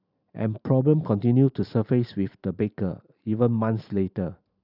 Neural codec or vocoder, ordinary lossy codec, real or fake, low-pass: vocoder, 44.1 kHz, 128 mel bands every 512 samples, BigVGAN v2; none; fake; 5.4 kHz